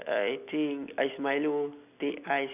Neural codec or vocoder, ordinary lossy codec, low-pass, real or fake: none; none; 3.6 kHz; real